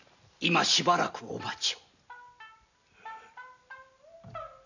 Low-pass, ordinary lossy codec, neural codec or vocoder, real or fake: 7.2 kHz; none; none; real